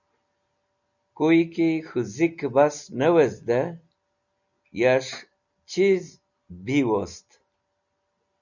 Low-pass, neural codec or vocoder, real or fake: 7.2 kHz; none; real